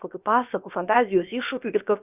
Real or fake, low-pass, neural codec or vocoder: fake; 3.6 kHz; codec, 16 kHz, about 1 kbps, DyCAST, with the encoder's durations